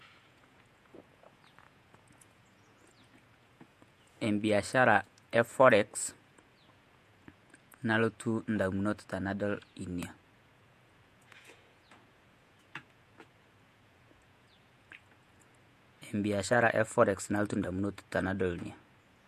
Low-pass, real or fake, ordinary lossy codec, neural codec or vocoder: 14.4 kHz; fake; MP3, 64 kbps; vocoder, 48 kHz, 128 mel bands, Vocos